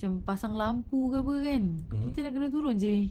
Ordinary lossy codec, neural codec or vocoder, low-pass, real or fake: Opus, 16 kbps; vocoder, 44.1 kHz, 128 mel bands every 512 samples, BigVGAN v2; 19.8 kHz; fake